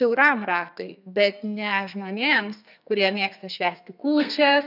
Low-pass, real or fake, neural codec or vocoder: 5.4 kHz; fake; codec, 32 kHz, 1.9 kbps, SNAC